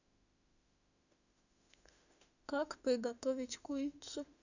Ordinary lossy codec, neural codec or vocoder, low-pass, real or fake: none; autoencoder, 48 kHz, 32 numbers a frame, DAC-VAE, trained on Japanese speech; 7.2 kHz; fake